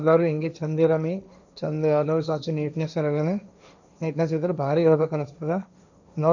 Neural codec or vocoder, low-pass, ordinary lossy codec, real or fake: codec, 16 kHz, 1.1 kbps, Voila-Tokenizer; 7.2 kHz; none; fake